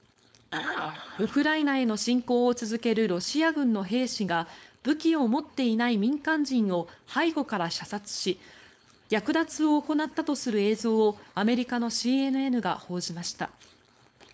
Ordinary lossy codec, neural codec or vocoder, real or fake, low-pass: none; codec, 16 kHz, 4.8 kbps, FACodec; fake; none